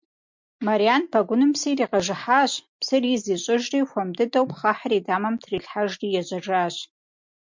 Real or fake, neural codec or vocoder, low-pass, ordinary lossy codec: real; none; 7.2 kHz; MP3, 64 kbps